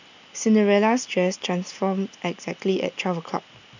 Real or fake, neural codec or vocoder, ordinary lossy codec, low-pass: real; none; none; 7.2 kHz